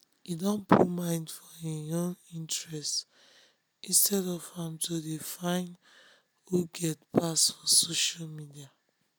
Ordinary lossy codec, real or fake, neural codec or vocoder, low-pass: none; real; none; none